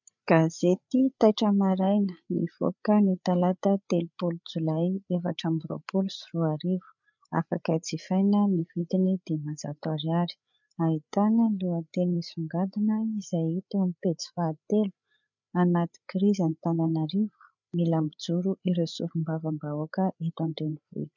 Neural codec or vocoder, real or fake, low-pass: codec, 16 kHz, 8 kbps, FreqCodec, larger model; fake; 7.2 kHz